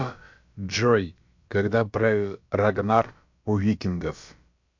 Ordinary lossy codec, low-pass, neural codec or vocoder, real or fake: MP3, 64 kbps; 7.2 kHz; codec, 16 kHz, about 1 kbps, DyCAST, with the encoder's durations; fake